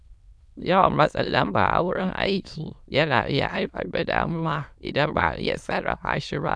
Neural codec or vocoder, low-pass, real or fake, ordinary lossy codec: autoencoder, 22.05 kHz, a latent of 192 numbers a frame, VITS, trained on many speakers; none; fake; none